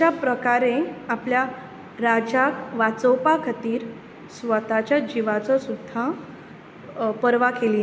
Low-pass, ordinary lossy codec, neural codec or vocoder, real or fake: none; none; none; real